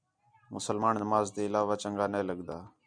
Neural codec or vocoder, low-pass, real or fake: none; 9.9 kHz; real